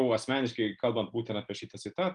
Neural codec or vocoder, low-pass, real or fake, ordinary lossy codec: none; 9.9 kHz; real; Opus, 32 kbps